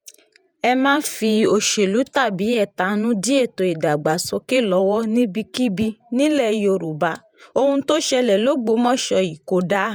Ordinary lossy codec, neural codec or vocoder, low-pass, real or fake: none; vocoder, 48 kHz, 128 mel bands, Vocos; none; fake